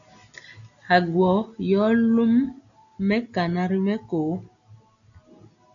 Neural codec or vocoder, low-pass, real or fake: none; 7.2 kHz; real